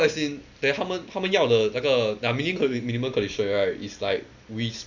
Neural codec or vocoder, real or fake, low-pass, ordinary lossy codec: none; real; 7.2 kHz; none